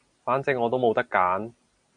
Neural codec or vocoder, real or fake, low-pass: none; real; 9.9 kHz